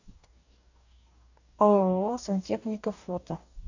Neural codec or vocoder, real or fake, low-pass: codec, 44.1 kHz, 2.6 kbps, DAC; fake; 7.2 kHz